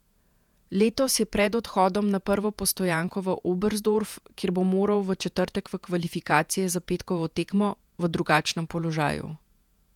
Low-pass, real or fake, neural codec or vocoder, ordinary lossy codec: 19.8 kHz; fake; vocoder, 48 kHz, 128 mel bands, Vocos; none